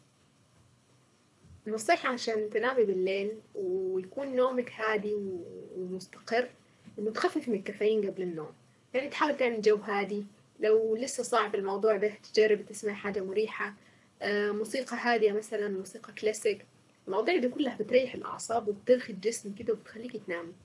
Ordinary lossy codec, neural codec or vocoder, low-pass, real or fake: none; codec, 24 kHz, 6 kbps, HILCodec; none; fake